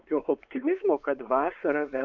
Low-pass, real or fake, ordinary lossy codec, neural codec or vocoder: 7.2 kHz; fake; MP3, 64 kbps; codec, 16 kHz, 4 kbps, X-Codec, WavLM features, trained on Multilingual LibriSpeech